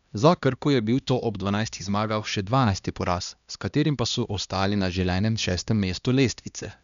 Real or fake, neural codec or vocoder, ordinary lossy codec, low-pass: fake; codec, 16 kHz, 1 kbps, X-Codec, HuBERT features, trained on LibriSpeech; none; 7.2 kHz